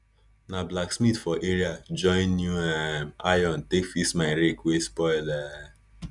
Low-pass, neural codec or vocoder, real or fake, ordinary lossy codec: 10.8 kHz; none; real; none